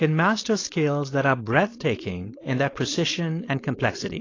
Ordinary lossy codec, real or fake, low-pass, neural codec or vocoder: AAC, 32 kbps; fake; 7.2 kHz; codec, 16 kHz, 4.8 kbps, FACodec